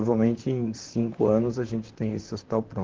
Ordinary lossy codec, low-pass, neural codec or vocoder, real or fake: Opus, 16 kbps; 7.2 kHz; vocoder, 44.1 kHz, 128 mel bands, Pupu-Vocoder; fake